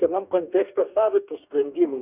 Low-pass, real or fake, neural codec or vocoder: 3.6 kHz; fake; codec, 44.1 kHz, 2.6 kbps, DAC